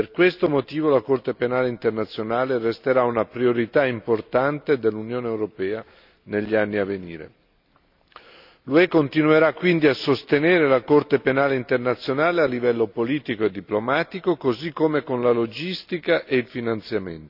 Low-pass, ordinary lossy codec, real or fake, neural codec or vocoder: 5.4 kHz; none; real; none